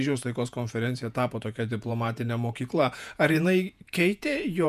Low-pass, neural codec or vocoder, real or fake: 14.4 kHz; vocoder, 48 kHz, 128 mel bands, Vocos; fake